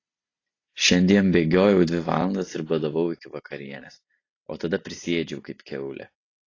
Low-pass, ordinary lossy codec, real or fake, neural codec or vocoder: 7.2 kHz; AAC, 32 kbps; real; none